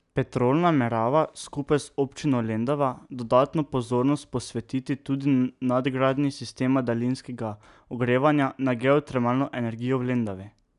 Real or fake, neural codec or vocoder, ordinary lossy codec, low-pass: real; none; none; 10.8 kHz